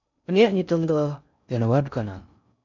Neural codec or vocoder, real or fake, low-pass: codec, 16 kHz in and 24 kHz out, 0.6 kbps, FocalCodec, streaming, 2048 codes; fake; 7.2 kHz